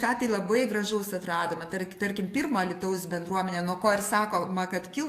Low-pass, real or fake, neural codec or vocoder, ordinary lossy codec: 14.4 kHz; fake; codec, 44.1 kHz, 7.8 kbps, DAC; AAC, 64 kbps